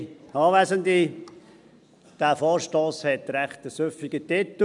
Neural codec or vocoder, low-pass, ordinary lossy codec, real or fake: none; 10.8 kHz; none; real